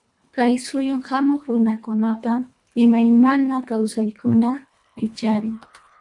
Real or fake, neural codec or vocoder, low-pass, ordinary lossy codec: fake; codec, 24 kHz, 1.5 kbps, HILCodec; 10.8 kHz; AAC, 64 kbps